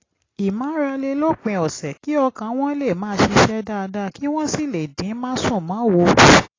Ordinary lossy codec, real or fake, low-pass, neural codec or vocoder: AAC, 32 kbps; real; 7.2 kHz; none